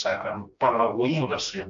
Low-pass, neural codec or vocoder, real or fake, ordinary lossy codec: 7.2 kHz; codec, 16 kHz, 1 kbps, FreqCodec, smaller model; fake; AAC, 48 kbps